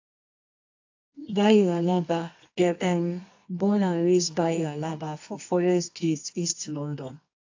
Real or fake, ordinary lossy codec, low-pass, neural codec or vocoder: fake; AAC, 48 kbps; 7.2 kHz; codec, 24 kHz, 0.9 kbps, WavTokenizer, medium music audio release